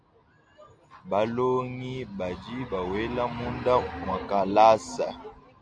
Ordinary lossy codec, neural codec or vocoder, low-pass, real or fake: AAC, 64 kbps; none; 9.9 kHz; real